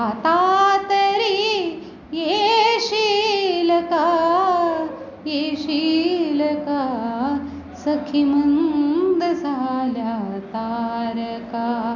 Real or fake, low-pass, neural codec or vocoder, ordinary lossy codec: real; 7.2 kHz; none; none